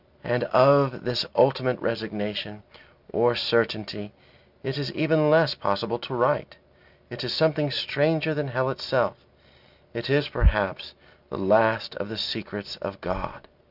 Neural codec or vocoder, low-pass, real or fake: none; 5.4 kHz; real